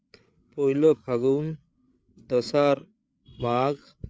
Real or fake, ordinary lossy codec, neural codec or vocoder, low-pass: fake; none; codec, 16 kHz, 8 kbps, FreqCodec, larger model; none